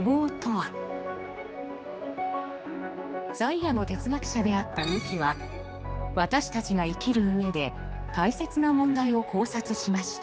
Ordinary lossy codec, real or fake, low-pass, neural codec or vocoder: none; fake; none; codec, 16 kHz, 2 kbps, X-Codec, HuBERT features, trained on general audio